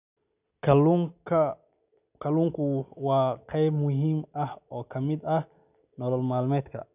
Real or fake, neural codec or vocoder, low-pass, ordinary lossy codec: real; none; 3.6 kHz; none